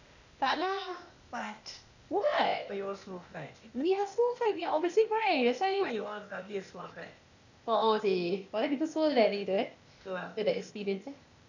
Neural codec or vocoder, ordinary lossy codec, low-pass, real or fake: codec, 16 kHz, 0.8 kbps, ZipCodec; none; 7.2 kHz; fake